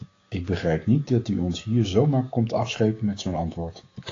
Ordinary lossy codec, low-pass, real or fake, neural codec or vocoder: AAC, 32 kbps; 7.2 kHz; fake; codec, 16 kHz, 6 kbps, DAC